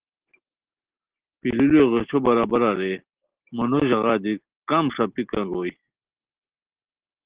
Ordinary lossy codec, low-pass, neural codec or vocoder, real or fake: Opus, 16 kbps; 3.6 kHz; none; real